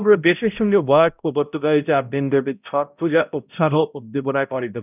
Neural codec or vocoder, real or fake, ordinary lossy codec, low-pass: codec, 16 kHz, 0.5 kbps, X-Codec, HuBERT features, trained on balanced general audio; fake; none; 3.6 kHz